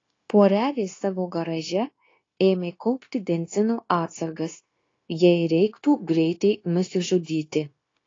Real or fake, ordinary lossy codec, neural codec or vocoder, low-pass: fake; AAC, 32 kbps; codec, 16 kHz, 0.9 kbps, LongCat-Audio-Codec; 7.2 kHz